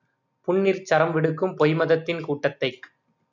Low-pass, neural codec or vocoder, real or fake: 7.2 kHz; none; real